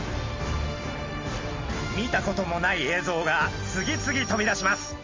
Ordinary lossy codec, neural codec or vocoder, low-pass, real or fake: Opus, 32 kbps; none; 7.2 kHz; real